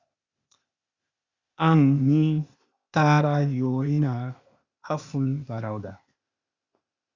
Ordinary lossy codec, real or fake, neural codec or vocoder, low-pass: Opus, 64 kbps; fake; codec, 16 kHz, 0.8 kbps, ZipCodec; 7.2 kHz